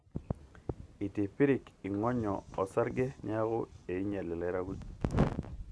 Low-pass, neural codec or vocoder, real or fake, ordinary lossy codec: none; none; real; none